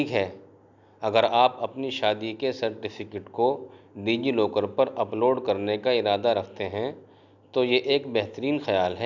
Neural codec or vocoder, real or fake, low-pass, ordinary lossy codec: none; real; 7.2 kHz; none